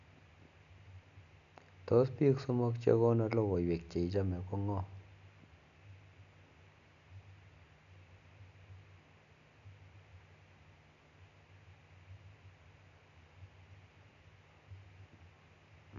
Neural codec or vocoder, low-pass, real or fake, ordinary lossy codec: none; 7.2 kHz; real; none